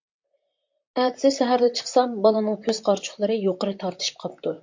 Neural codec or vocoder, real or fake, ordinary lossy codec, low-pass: vocoder, 44.1 kHz, 128 mel bands, Pupu-Vocoder; fake; MP3, 48 kbps; 7.2 kHz